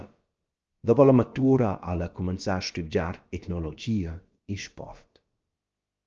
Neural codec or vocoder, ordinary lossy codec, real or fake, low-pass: codec, 16 kHz, about 1 kbps, DyCAST, with the encoder's durations; Opus, 24 kbps; fake; 7.2 kHz